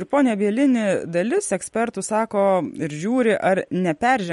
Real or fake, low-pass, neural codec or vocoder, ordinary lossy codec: fake; 19.8 kHz; vocoder, 44.1 kHz, 128 mel bands every 256 samples, BigVGAN v2; MP3, 48 kbps